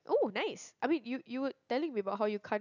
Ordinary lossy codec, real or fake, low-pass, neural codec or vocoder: none; real; 7.2 kHz; none